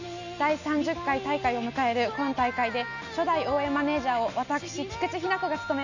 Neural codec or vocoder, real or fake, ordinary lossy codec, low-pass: none; real; none; 7.2 kHz